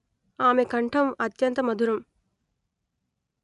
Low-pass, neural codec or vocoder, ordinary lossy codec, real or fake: 10.8 kHz; none; none; real